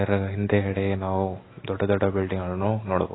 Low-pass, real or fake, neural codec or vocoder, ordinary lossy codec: 7.2 kHz; real; none; AAC, 16 kbps